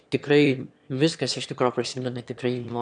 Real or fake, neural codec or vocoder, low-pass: fake; autoencoder, 22.05 kHz, a latent of 192 numbers a frame, VITS, trained on one speaker; 9.9 kHz